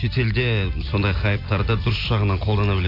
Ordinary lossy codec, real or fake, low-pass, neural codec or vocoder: AAC, 32 kbps; real; 5.4 kHz; none